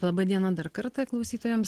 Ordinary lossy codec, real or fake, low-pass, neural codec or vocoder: Opus, 16 kbps; real; 14.4 kHz; none